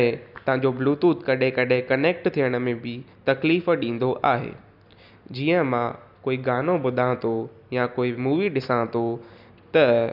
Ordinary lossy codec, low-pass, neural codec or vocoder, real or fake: none; 5.4 kHz; none; real